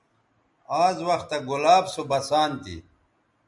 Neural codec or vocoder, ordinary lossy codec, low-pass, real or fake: none; MP3, 96 kbps; 10.8 kHz; real